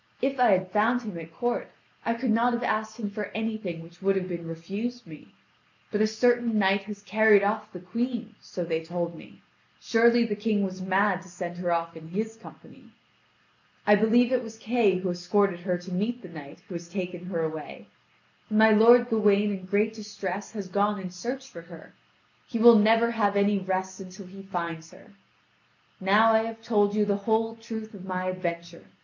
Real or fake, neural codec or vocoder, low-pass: real; none; 7.2 kHz